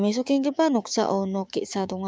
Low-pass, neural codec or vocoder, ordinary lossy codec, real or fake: none; codec, 16 kHz, 6 kbps, DAC; none; fake